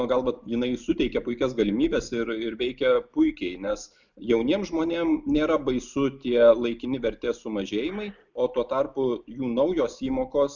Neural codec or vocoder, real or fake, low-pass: none; real; 7.2 kHz